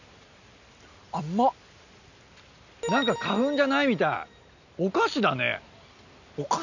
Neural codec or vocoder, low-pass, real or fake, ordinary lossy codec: none; 7.2 kHz; real; none